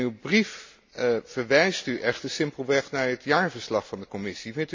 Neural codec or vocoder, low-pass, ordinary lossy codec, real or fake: none; 7.2 kHz; MP3, 48 kbps; real